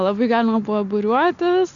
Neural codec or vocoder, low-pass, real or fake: none; 7.2 kHz; real